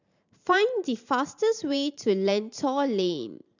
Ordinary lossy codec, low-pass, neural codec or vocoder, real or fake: none; 7.2 kHz; vocoder, 44.1 kHz, 128 mel bands every 512 samples, BigVGAN v2; fake